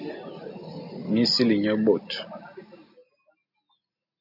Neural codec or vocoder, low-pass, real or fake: none; 5.4 kHz; real